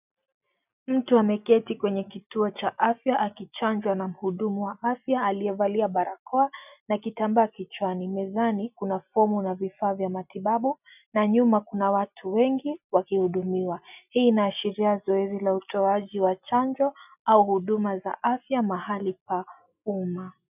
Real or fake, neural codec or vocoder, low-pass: real; none; 3.6 kHz